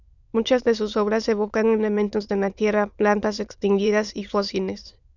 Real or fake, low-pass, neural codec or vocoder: fake; 7.2 kHz; autoencoder, 22.05 kHz, a latent of 192 numbers a frame, VITS, trained on many speakers